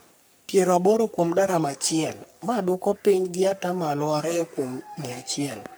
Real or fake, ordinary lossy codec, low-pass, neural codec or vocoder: fake; none; none; codec, 44.1 kHz, 3.4 kbps, Pupu-Codec